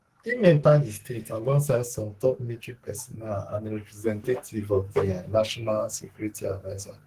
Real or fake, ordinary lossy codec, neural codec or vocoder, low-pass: fake; Opus, 16 kbps; codec, 44.1 kHz, 2.6 kbps, SNAC; 14.4 kHz